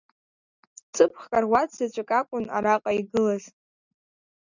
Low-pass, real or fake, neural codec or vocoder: 7.2 kHz; real; none